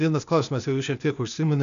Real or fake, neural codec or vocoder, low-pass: fake; codec, 16 kHz, 0.8 kbps, ZipCodec; 7.2 kHz